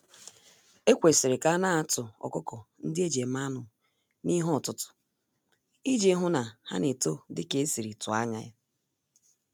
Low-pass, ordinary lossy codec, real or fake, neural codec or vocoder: none; none; real; none